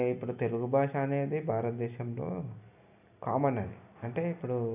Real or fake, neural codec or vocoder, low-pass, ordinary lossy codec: real; none; 3.6 kHz; none